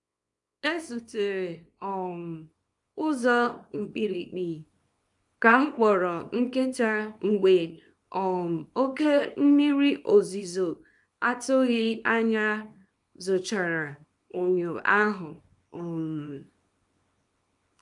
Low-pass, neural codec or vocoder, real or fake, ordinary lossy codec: 10.8 kHz; codec, 24 kHz, 0.9 kbps, WavTokenizer, small release; fake; none